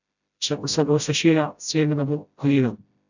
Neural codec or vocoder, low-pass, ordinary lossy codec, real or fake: codec, 16 kHz, 0.5 kbps, FreqCodec, smaller model; 7.2 kHz; none; fake